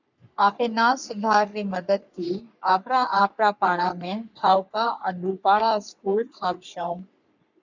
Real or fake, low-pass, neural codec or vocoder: fake; 7.2 kHz; codec, 44.1 kHz, 3.4 kbps, Pupu-Codec